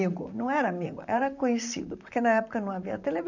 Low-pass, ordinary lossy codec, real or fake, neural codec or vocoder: 7.2 kHz; none; fake; vocoder, 44.1 kHz, 128 mel bands, Pupu-Vocoder